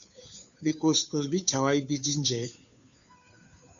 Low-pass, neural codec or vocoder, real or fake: 7.2 kHz; codec, 16 kHz, 2 kbps, FunCodec, trained on Chinese and English, 25 frames a second; fake